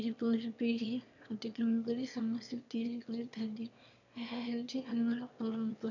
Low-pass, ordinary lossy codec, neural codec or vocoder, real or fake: 7.2 kHz; none; autoencoder, 22.05 kHz, a latent of 192 numbers a frame, VITS, trained on one speaker; fake